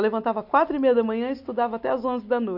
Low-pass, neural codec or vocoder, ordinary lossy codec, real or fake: 5.4 kHz; none; none; real